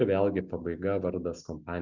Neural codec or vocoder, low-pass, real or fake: none; 7.2 kHz; real